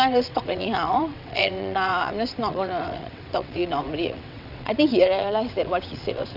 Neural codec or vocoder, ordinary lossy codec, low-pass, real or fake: vocoder, 22.05 kHz, 80 mel bands, WaveNeXt; none; 5.4 kHz; fake